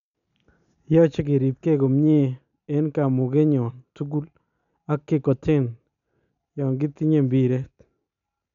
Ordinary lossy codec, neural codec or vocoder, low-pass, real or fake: none; none; 7.2 kHz; real